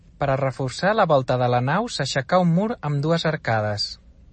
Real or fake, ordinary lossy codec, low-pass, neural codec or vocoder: real; MP3, 32 kbps; 10.8 kHz; none